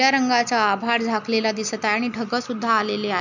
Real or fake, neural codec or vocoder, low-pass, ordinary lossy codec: real; none; 7.2 kHz; none